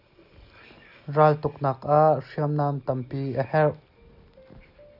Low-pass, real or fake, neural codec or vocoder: 5.4 kHz; real; none